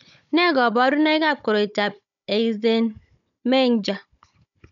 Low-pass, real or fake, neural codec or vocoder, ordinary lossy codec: 7.2 kHz; fake; codec, 16 kHz, 16 kbps, FunCodec, trained on Chinese and English, 50 frames a second; none